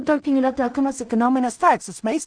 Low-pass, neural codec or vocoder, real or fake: 9.9 kHz; codec, 16 kHz in and 24 kHz out, 0.4 kbps, LongCat-Audio-Codec, two codebook decoder; fake